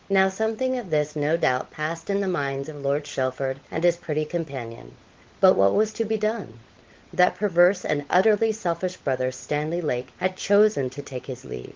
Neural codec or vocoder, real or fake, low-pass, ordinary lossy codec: codec, 16 kHz, 16 kbps, FunCodec, trained on LibriTTS, 50 frames a second; fake; 7.2 kHz; Opus, 16 kbps